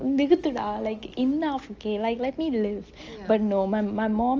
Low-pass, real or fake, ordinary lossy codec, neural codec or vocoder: 7.2 kHz; real; Opus, 24 kbps; none